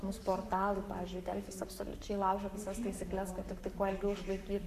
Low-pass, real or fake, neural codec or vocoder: 14.4 kHz; fake; codec, 44.1 kHz, 7.8 kbps, Pupu-Codec